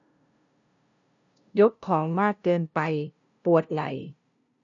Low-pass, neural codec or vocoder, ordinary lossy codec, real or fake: 7.2 kHz; codec, 16 kHz, 0.5 kbps, FunCodec, trained on LibriTTS, 25 frames a second; none; fake